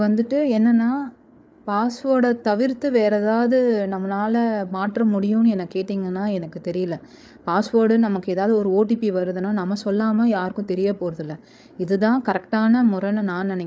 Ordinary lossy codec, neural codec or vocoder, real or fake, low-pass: none; codec, 16 kHz, 8 kbps, FreqCodec, larger model; fake; none